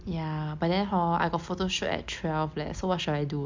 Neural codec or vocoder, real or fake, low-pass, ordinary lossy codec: none; real; 7.2 kHz; MP3, 64 kbps